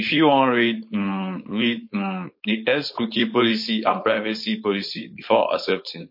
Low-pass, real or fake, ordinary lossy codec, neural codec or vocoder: 5.4 kHz; fake; MP3, 32 kbps; codec, 16 kHz, 4.8 kbps, FACodec